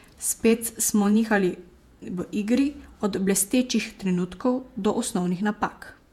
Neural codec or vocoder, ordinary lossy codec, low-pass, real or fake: vocoder, 48 kHz, 128 mel bands, Vocos; MP3, 96 kbps; 19.8 kHz; fake